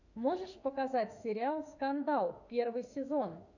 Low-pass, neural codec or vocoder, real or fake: 7.2 kHz; autoencoder, 48 kHz, 32 numbers a frame, DAC-VAE, trained on Japanese speech; fake